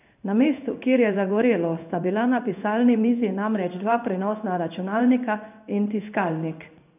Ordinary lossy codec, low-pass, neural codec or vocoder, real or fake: none; 3.6 kHz; codec, 16 kHz in and 24 kHz out, 1 kbps, XY-Tokenizer; fake